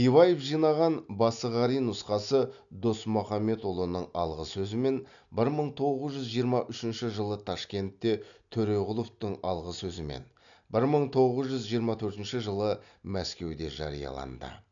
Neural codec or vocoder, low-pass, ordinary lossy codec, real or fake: none; 7.2 kHz; none; real